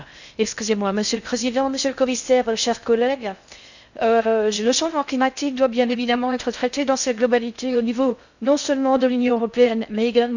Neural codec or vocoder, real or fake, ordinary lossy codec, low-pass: codec, 16 kHz in and 24 kHz out, 0.6 kbps, FocalCodec, streaming, 2048 codes; fake; none; 7.2 kHz